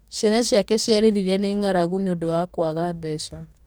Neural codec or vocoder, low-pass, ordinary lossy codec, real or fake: codec, 44.1 kHz, 2.6 kbps, DAC; none; none; fake